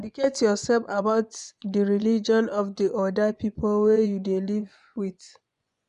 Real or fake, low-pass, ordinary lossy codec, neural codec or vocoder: fake; 10.8 kHz; none; vocoder, 24 kHz, 100 mel bands, Vocos